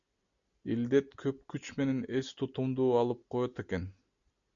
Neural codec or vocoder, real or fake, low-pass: none; real; 7.2 kHz